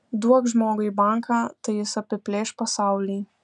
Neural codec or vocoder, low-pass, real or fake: none; 10.8 kHz; real